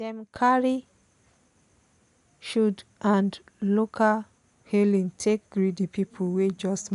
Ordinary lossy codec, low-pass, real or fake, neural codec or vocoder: none; 10.8 kHz; real; none